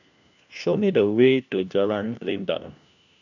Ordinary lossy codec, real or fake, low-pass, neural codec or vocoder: none; fake; 7.2 kHz; codec, 16 kHz, 1 kbps, FunCodec, trained on LibriTTS, 50 frames a second